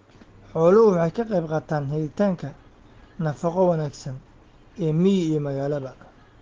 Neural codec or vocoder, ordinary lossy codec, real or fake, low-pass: none; Opus, 16 kbps; real; 7.2 kHz